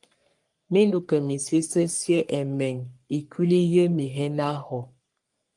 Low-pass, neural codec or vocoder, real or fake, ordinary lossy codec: 10.8 kHz; codec, 44.1 kHz, 3.4 kbps, Pupu-Codec; fake; Opus, 32 kbps